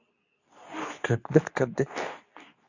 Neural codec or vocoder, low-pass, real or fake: codec, 24 kHz, 0.9 kbps, WavTokenizer, medium speech release version 2; 7.2 kHz; fake